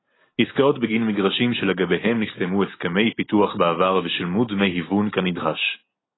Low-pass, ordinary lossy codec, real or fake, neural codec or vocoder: 7.2 kHz; AAC, 16 kbps; real; none